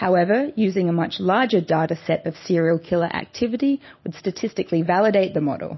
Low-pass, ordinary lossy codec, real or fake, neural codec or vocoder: 7.2 kHz; MP3, 24 kbps; real; none